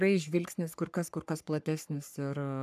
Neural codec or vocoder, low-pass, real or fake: codec, 44.1 kHz, 3.4 kbps, Pupu-Codec; 14.4 kHz; fake